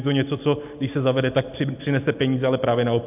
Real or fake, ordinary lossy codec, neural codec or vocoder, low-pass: real; AAC, 32 kbps; none; 3.6 kHz